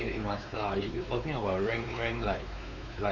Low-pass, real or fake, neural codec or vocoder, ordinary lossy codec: 7.2 kHz; fake; codec, 16 kHz, 4 kbps, X-Codec, WavLM features, trained on Multilingual LibriSpeech; MP3, 64 kbps